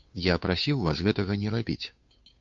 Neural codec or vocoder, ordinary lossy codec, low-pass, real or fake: codec, 16 kHz, 2 kbps, FunCodec, trained on Chinese and English, 25 frames a second; MP3, 48 kbps; 7.2 kHz; fake